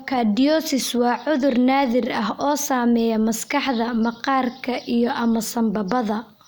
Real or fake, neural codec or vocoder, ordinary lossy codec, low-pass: real; none; none; none